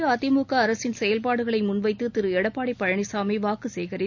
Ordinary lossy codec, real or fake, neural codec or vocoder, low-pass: none; real; none; 7.2 kHz